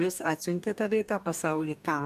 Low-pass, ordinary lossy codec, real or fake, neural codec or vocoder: 14.4 kHz; MP3, 96 kbps; fake; codec, 44.1 kHz, 2.6 kbps, DAC